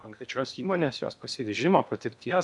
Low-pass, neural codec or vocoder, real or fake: 10.8 kHz; codec, 16 kHz in and 24 kHz out, 0.8 kbps, FocalCodec, streaming, 65536 codes; fake